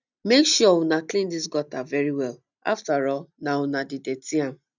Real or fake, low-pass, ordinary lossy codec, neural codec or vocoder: real; 7.2 kHz; none; none